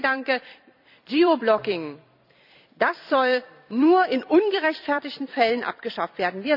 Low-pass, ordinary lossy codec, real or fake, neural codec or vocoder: 5.4 kHz; none; fake; vocoder, 44.1 kHz, 128 mel bands every 256 samples, BigVGAN v2